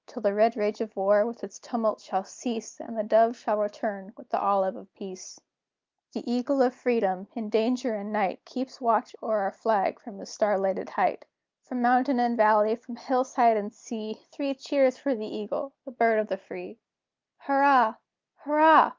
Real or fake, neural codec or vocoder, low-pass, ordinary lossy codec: real; none; 7.2 kHz; Opus, 32 kbps